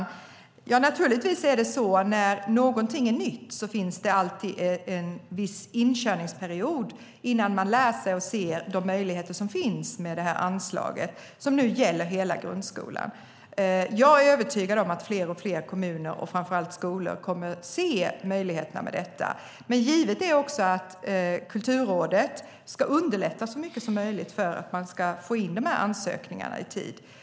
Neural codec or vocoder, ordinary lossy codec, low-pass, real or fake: none; none; none; real